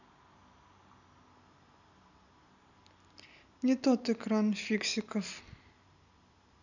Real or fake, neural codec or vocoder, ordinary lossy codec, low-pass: real; none; none; 7.2 kHz